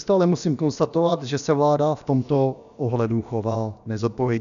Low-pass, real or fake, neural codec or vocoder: 7.2 kHz; fake; codec, 16 kHz, about 1 kbps, DyCAST, with the encoder's durations